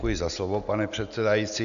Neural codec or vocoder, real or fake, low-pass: none; real; 7.2 kHz